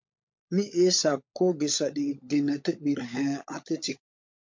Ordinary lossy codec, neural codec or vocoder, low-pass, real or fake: MP3, 48 kbps; codec, 16 kHz, 16 kbps, FunCodec, trained on LibriTTS, 50 frames a second; 7.2 kHz; fake